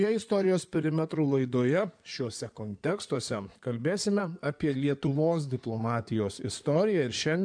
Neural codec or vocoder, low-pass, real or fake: codec, 16 kHz in and 24 kHz out, 2.2 kbps, FireRedTTS-2 codec; 9.9 kHz; fake